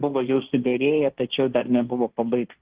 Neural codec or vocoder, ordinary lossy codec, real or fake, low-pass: codec, 16 kHz, 1.1 kbps, Voila-Tokenizer; Opus, 16 kbps; fake; 3.6 kHz